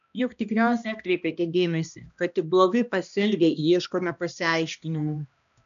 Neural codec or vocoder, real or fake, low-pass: codec, 16 kHz, 1 kbps, X-Codec, HuBERT features, trained on balanced general audio; fake; 7.2 kHz